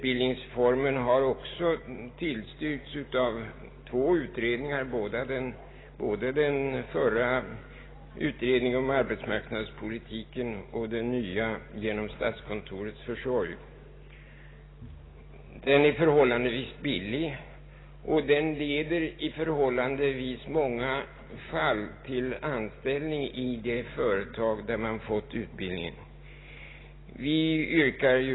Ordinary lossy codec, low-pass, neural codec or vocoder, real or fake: AAC, 16 kbps; 7.2 kHz; none; real